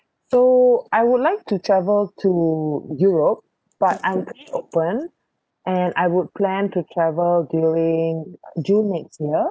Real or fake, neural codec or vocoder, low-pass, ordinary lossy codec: real; none; none; none